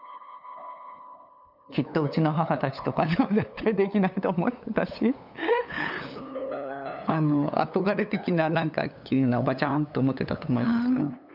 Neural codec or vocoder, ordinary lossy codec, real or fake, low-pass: codec, 16 kHz, 8 kbps, FunCodec, trained on LibriTTS, 25 frames a second; none; fake; 5.4 kHz